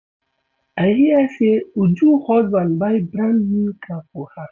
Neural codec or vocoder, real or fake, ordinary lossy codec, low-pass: none; real; none; 7.2 kHz